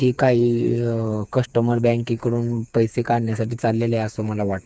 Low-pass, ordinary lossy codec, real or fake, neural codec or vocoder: none; none; fake; codec, 16 kHz, 4 kbps, FreqCodec, smaller model